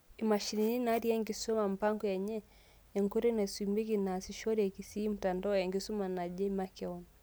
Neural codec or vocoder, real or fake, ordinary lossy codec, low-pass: none; real; none; none